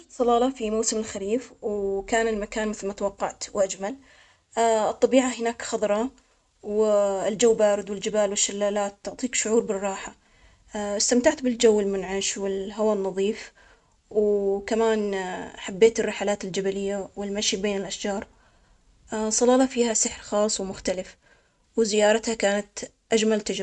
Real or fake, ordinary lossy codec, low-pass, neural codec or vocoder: real; none; 10.8 kHz; none